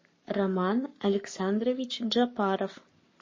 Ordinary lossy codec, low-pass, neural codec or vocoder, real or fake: MP3, 32 kbps; 7.2 kHz; codec, 44.1 kHz, 7.8 kbps, Pupu-Codec; fake